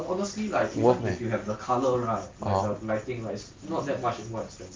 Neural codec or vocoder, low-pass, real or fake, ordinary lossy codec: none; 7.2 kHz; real; Opus, 32 kbps